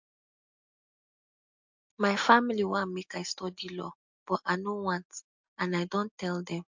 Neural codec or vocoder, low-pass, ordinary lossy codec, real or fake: none; 7.2 kHz; MP3, 64 kbps; real